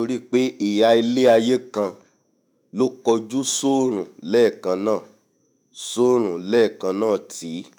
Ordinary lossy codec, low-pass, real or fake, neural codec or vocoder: none; none; fake; autoencoder, 48 kHz, 128 numbers a frame, DAC-VAE, trained on Japanese speech